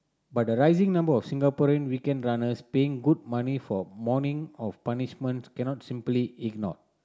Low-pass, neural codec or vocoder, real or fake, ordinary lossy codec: none; none; real; none